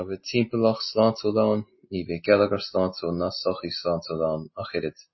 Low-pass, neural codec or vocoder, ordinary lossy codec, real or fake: 7.2 kHz; none; MP3, 24 kbps; real